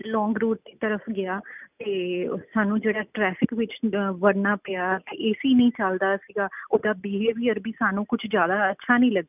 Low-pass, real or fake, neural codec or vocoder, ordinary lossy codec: 3.6 kHz; real; none; none